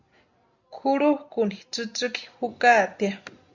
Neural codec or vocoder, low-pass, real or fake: none; 7.2 kHz; real